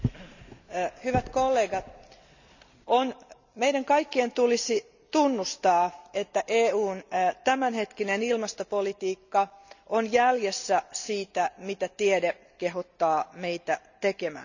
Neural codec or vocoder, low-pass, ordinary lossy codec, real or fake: none; 7.2 kHz; none; real